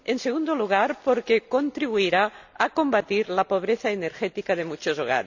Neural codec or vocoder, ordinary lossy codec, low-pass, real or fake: none; none; 7.2 kHz; real